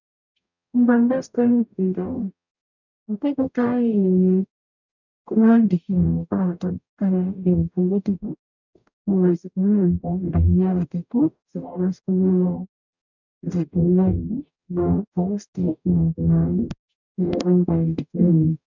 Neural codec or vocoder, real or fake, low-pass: codec, 44.1 kHz, 0.9 kbps, DAC; fake; 7.2 kHz